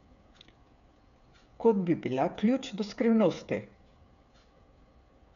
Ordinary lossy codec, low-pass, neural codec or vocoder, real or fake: none; 7.2 kHz; codec, 16 kHz, 8 kbps, FreqCodec, smaller model; fake